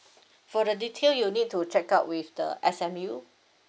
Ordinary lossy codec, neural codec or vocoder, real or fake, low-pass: none; none; real; none